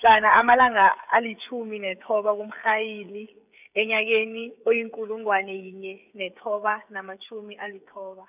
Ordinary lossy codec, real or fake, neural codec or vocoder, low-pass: none; fake; codec, 16 kHz, 16 kbps, FreqCodec, smaller model; 3.6 kHz